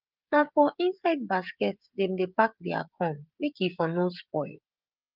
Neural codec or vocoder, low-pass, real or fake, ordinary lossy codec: codec, 16 kHz, 16 kbps, FreqCodec, smaller model; 5.4 kHz; fake; Opus, 24 kbps